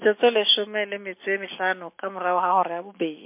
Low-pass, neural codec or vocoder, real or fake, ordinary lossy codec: 3.6 kHz; none; real; MP3, 24 kbps